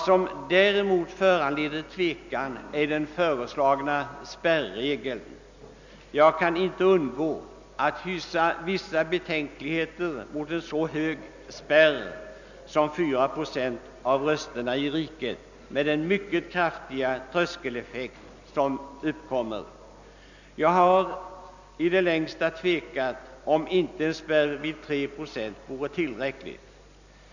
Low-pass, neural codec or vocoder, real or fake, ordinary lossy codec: 7.2 kHz; none; real; none